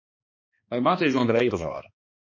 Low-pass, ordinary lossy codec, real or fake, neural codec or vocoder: 7.2 kHz; MP3, 32 kbps; fake; codec, 16 kHz, 2 kbps, X-Codec, HuBERT features, trained on balanced general audio